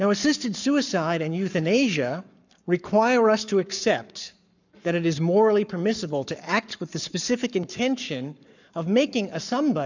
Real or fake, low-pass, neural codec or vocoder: fake; 7.2 kHz; codec, 44.1 kHz, 7.8 kbps, Pupu-Codec